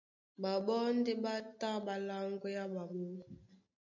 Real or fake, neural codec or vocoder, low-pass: real; none; 7.2 kHz